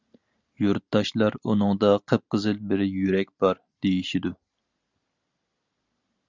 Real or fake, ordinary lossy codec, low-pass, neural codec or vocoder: real; Opus, 64 kbps; 7.2 kHz; none